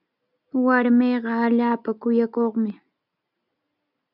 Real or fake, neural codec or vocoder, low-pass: real; none; 5.4 kHz